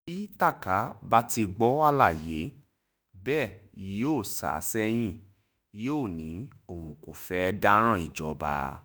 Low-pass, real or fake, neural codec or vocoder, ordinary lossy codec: none; fake; autoencoder, 48 kHz, 32 numbers a frame, DAC-VAE, trained on Japanese speech; none